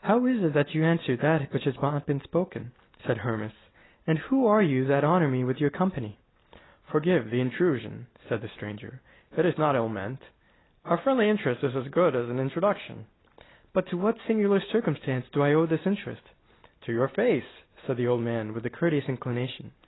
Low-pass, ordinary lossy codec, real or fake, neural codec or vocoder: 7.2 kHz; AAC, 16 kbps; real; none